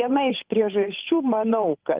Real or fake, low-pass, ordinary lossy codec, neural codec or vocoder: fake; 3.6 kHz; Opus, 32 kbps; codec, 16 kHz, 8 kbps, FunCodec, trained on Chinese and English, 25 frames a second